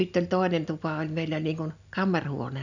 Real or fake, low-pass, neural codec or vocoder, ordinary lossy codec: real; 7.2 kHz; none; none